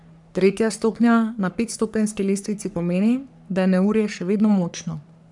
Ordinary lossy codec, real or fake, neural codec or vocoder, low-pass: none; fake; codec, 44.1 kHz, 3.4 kbps, Pupu-Codec; 10.8 kHz